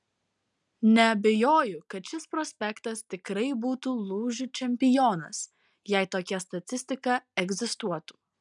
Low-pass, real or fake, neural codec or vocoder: 10.8 kHz; real; none